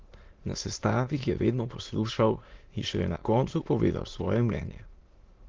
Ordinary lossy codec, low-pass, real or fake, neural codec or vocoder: Opus, 16 kbps; 7.2 kHz; fake; autoencoder, 22.05 kHz, a latent of 192 numbers a frame, VITS, trained on many speakers